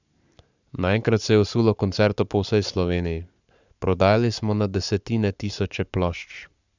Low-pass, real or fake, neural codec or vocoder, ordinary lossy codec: 7.2 kHz; fake; codec, 44.1 kHz, 7.8 kbps, Pupu-Codec; none